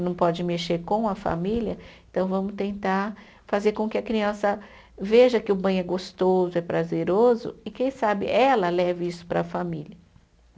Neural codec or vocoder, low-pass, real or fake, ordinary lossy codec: none; none; real; none